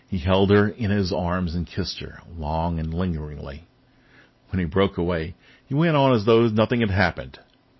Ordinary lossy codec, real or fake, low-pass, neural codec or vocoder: MP3, 24 kbps; real; 7.2 kHz; none